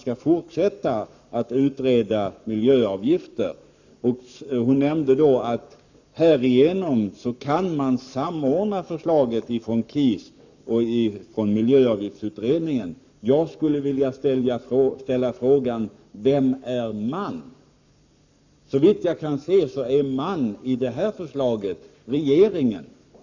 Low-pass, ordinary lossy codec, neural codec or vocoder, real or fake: 7.2 kHz; none; codec, 44.1 kHz, 7.8 kbps, Pupu-Codec; fake